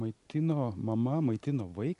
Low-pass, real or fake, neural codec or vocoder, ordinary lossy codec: 10.8 kHz; real; none; MP3, 96 kbps